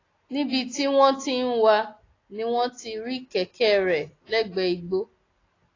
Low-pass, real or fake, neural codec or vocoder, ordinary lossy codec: 7.2 kHz; real; none; AAC, 32 kbps